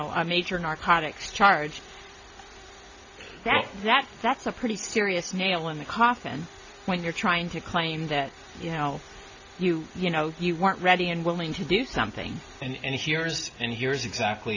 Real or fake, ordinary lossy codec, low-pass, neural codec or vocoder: real; AAC, 48 kbps; 7.2 kHz; none